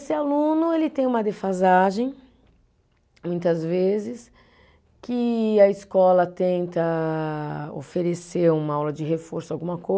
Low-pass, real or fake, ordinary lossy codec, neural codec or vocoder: none; real; none; none